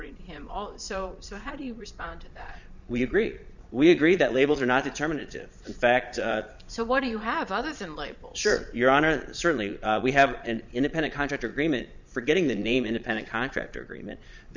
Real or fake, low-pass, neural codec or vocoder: fake; 7.2 kHz; vocoder, 22.05 kHz, 80 mel bands, Vocos